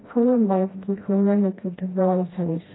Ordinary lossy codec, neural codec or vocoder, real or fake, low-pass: AAC, 16 kbps; codec, 16 kHz, 1 kbps, FreqCodec, smaller model; fake; 7.2 kHz